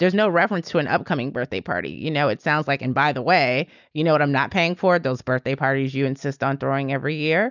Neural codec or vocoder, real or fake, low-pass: none; real; 7.2 kHz